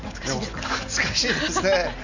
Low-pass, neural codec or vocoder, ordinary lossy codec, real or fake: 7.2 kHz; none; none; real